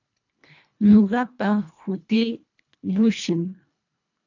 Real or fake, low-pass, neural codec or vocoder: fake; 7.2 kHz; codec, 24 kHz, 1.5 kbps, HILCodec